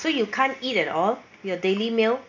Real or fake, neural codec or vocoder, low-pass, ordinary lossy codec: real; none; 7.2 kHz; none